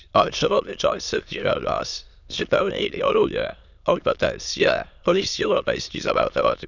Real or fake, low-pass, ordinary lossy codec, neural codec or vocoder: fake; 7.2 kHz; none; autoencoder, 22.05 kHz, a latent of 192 numbers a frame, VITS, trained on many speakers